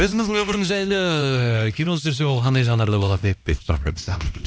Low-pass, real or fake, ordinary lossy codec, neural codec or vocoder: none; fake; none; codec, 16 kHz, 1 kbps, X-Codec, HuBERT features, trained on LibriSpeech